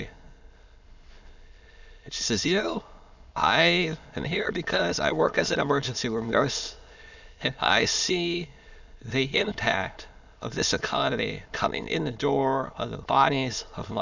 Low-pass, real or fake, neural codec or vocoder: 7.2 kHz; fake; autoencoder, 22.05 kHz, a latent of 192 numbers a frame, VITS, trained on many speakers